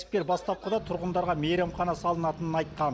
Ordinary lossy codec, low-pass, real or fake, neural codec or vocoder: none; none; real; none